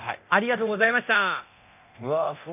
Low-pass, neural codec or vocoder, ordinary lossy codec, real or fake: 3.6 kHz; codec, 24 kHz, 0.9 kbps, DualCodec; none; fake